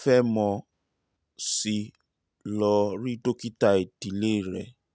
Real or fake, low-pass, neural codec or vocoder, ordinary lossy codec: real; none; none; none